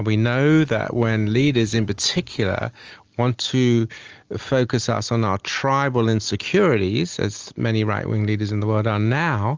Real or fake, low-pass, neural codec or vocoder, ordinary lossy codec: real; 7.2 kHz; none; Opus, 24 kbps